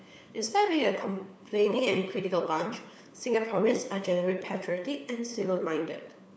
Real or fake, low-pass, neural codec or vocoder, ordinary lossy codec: fake; none; codec, 16 kHz, 4 kbps, FunCodec, trained on LibriTTS, 50 frames a second; none